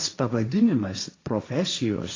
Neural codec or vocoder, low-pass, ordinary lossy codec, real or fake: codec, 16 kHz, 1.1 kbps, Voila-Tokenizer; 7.2 kHz; AAC, 32 kbps; fake